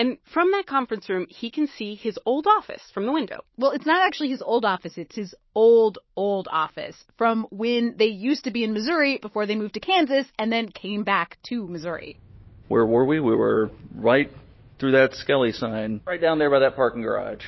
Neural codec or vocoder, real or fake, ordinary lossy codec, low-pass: autoencoder, 48 kHz, 128 numbers a frame, DAC-VAE, trained on Japanese speech; fake; MP3, 24 kbps; 7.2 kHz